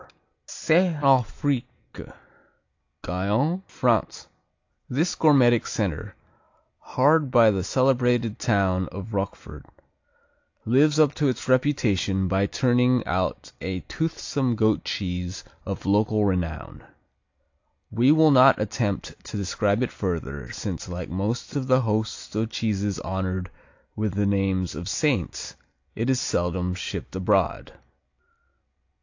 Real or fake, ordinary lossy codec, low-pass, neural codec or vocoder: real; AAC, 48 kbps; 7.2 kHz; none